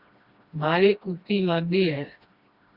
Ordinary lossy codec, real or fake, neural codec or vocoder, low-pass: Opus, 64 kbps; fake; codec, 16 kHz, 1 kbps, FreqCodec, smaller model; 5.4 kHz